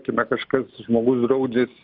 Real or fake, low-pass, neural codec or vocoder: real; 5.4 kHz; none